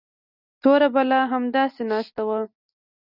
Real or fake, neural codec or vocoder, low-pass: real; none; 5.4 kHz